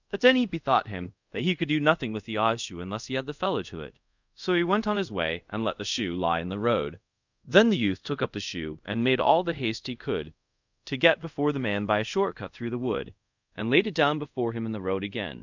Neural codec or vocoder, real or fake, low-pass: codec, 24 kHz, 0.5 kbps, DualCodec; fake; 7.2 kHz